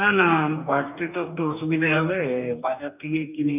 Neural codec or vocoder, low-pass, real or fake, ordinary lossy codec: codec, 44.1 kHz, 2.6 kbps, DAC; 3.6 kHz; fake; none